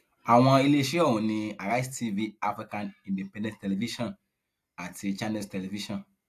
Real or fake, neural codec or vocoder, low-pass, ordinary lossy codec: real; none; 14.4 kHz; AAC, 64 kbps